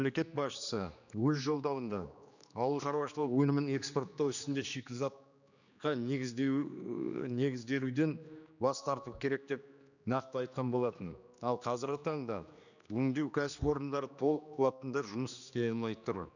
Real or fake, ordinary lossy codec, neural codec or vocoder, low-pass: fake; none; codec, 16 kHz, 2 kbps, X-Codec, HuBERT features, trained on general audio; 7.2 kHz